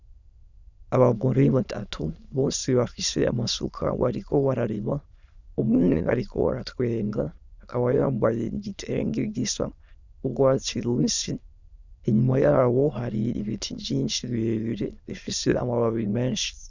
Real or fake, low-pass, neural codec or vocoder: fake; 7.2 kHz; autoencoder, 22.05 kHz, a latent of 192 numbers a frame, VITS, trained on many speakers